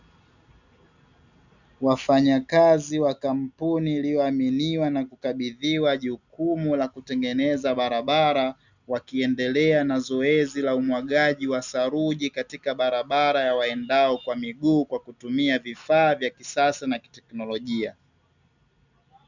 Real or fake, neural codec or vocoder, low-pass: real; none; 7.2 kHz